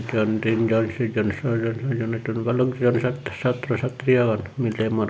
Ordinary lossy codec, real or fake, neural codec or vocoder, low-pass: none; real; none; none